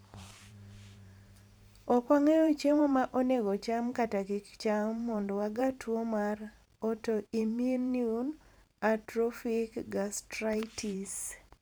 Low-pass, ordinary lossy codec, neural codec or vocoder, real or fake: none; none; vocoder, 44.1 kHz, 128 mel bands every 512 samples, BigVGAN v2; fake